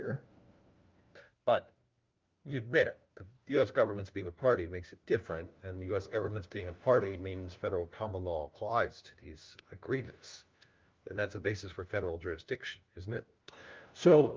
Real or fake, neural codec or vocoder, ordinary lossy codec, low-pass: fake; codec, 16 kHz, 1 kbps, FunCodec, trained on LibriTTS, 50 frames a second; Opus, 32 kbps; 7.2 kHz